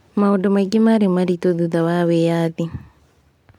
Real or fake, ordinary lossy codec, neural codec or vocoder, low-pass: real; MP3, 96 kbps; none; 19.8 kHz